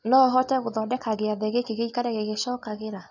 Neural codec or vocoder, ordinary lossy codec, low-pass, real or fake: none; none; 7.2 kHz; real